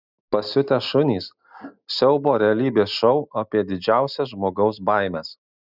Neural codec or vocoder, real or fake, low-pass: none; real; 5.4 kHz